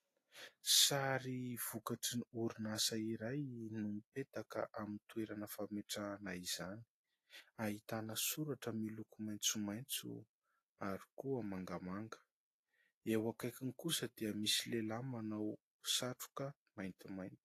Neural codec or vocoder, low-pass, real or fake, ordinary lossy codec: none; 14.4 kHz; real; AAC, 48 kbps